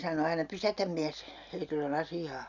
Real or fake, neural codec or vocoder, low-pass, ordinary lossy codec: real; none; 7.2 kHz; none